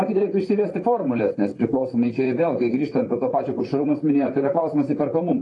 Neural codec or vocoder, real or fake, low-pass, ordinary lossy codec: vocoder, 44.1 kHz, 128 mel bands, Pupu-Vocoder; fake; 10.8 kHz; AAC, 32 kbps